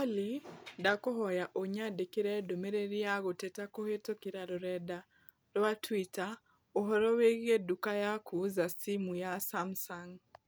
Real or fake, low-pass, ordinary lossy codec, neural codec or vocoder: real; none; none; none